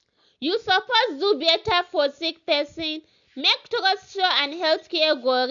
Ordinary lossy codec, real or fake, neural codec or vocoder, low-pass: none; real; none; 7.2 kHz